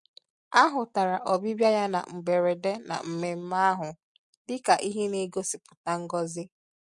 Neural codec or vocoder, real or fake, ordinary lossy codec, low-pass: none; real; MP3, 48 kbps; 10.8 kHz